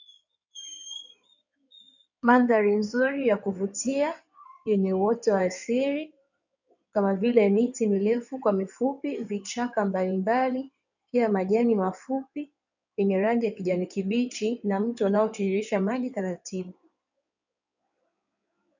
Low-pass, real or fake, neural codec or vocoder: 7.2 kHz; fake; codec, 16 kHz in and 24 kHz out, 2.2 kbps, FireRedTTS-2 codec